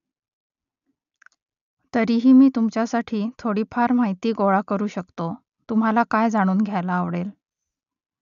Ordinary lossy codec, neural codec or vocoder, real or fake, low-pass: none; none; real; 7.2 kHz